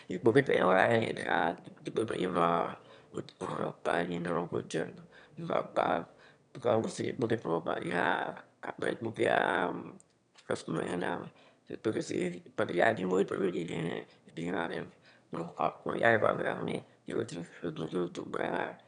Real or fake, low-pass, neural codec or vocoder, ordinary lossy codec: fake; 9.9 kHz; autoencoder, 22.05 kHz, a latent of 192 numbers a frame, VITS, trained on one speaker; none